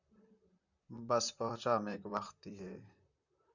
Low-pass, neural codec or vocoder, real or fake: 7.2 kHz; vocoder, 44.1 kHz, 128 mel bands, Pupu-Vocoder; fake